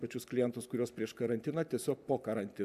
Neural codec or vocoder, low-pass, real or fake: none; 14.4 kHz; real